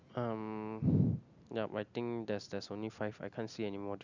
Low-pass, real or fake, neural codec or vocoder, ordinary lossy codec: 7.2 kHz; real; none; none